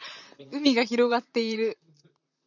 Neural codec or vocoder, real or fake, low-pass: vocoder, 44.1 kHz, 128 mel bands, Pupu-Vocoder; fake; 7.2 kHz